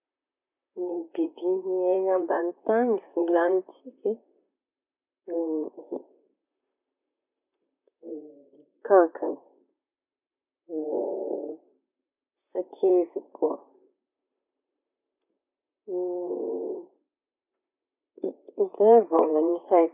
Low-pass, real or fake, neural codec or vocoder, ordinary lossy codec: 3.6 kHz; fake; vocoder, 44.1 kHz, 128 mel bands, Pupu-Vocoder; none